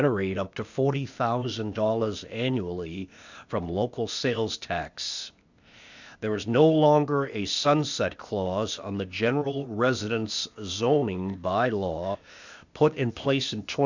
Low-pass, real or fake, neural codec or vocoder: 7.2 kHz; fake; codec, 16 kHz, 0.8 kbps, ZipCodec